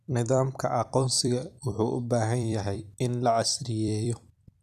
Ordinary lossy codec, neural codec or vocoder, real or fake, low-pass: none; vocoder, 44.1 kHz, 128 mel bands every 256 samples, BigVGAN v2; fake; 14.4 kHz